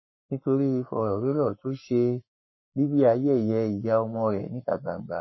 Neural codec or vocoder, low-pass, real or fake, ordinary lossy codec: codec, 44.1 kHz, 7.8 kbps, Pupu-Codec; 7.2 kHz; fake; MP3, 24 kbps